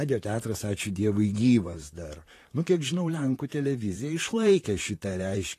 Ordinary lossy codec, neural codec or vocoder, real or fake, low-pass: AAC, 48 kbps; vocoder, 44.1 kHz, 128 mel bands, Pupu-Vocoder; fake; 14.4 kHz